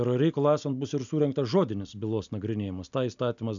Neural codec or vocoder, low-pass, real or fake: none; 7.2 kHz; real